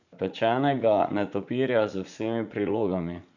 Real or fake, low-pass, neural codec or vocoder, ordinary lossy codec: fake; 7.2 kHz; vocoder, 44.1 kHz, 80 mel bands, Vocos; none